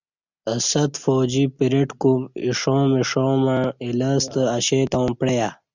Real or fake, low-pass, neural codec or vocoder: real; 7.2 kHz; none